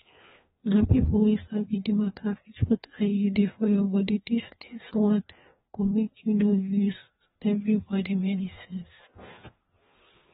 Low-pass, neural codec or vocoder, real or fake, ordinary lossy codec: 7.2 kHz; codec, 16 kHz, 2 kbps, FreqCodec, larger model; fake; AAC, 16 kbps